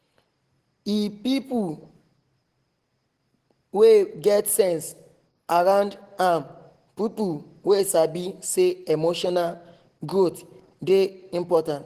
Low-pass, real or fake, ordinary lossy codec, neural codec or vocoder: 14.4 kHz; real; Opus, 16 kbps; none